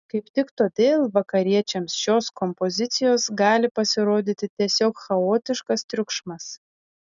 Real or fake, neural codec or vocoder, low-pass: real; none; 7.2 kHz